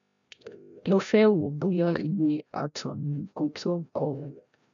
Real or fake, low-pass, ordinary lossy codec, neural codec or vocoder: fake; 7.2 kHz; none; codec, 16 kHz, 0.5 kbps, FreqCodec, larger model